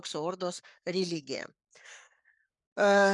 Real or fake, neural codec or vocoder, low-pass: fake; codec, 44.1 kHz, 7.8 kbps, Pupu-Codec; 10.8 kHz